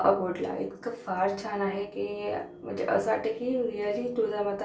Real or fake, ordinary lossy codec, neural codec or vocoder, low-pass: real; none; none; none